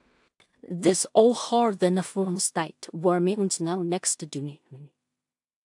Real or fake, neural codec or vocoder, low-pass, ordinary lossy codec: fake; codec, 16 kHz in and 24 kHz out, 0.4 kbps, LongCat-Audio-Codec, two codebook decoder; 10.8 kHz; AAC, 64 kbps